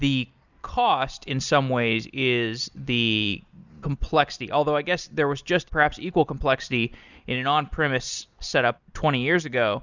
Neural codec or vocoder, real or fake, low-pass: none; real; 7.2 kHz